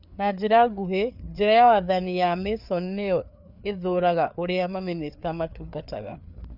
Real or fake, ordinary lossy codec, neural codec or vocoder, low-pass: fake; none; codec, 16 kHz, 4 kbps, FreqCodec, larger model; 5.4 kHz